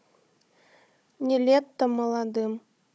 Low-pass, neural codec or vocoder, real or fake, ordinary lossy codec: none; codec, 16 kHz, 16 kbps, FunCodec, trained on Chinese and English, 50 frames a second; fake; none